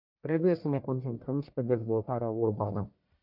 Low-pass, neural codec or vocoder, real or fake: 5.4 kHz; codec, 44.1 kHz, 1.7 kbps, Pupu-Codec; fake